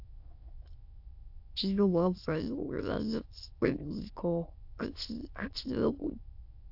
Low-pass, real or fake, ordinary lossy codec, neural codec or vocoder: 5.4 kHz; fake; AAC, 48 kbps; autoencoder, 22.05 kHz, a latent of 192 numbers a frame, VITS, trained on many speakers